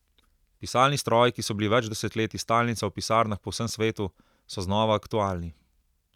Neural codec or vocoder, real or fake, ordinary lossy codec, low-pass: none; real; none; 19.8 kHz